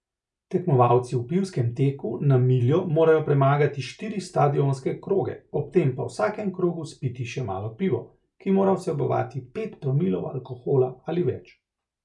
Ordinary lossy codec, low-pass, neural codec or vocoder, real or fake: none; 10.8 kHz; none; real